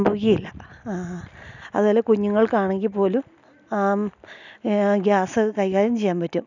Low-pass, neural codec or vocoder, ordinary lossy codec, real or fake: 7.2 kHz; none; none; real